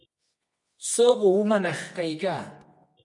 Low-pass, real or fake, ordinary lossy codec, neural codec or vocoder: 10.8 kHz; fake; MP3, 48 kbps; codec, 24 kHz, 0.9 kbps, WavTokenizer, medium music audio release